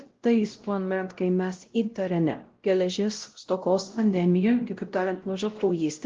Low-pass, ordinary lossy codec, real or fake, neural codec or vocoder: 7.2 kHz; Opus, 16 kbps; fake; codec, 16 kHz, 0.5 kbps, X-Codec, WavLM features, trained on Multilingual LibriSpeech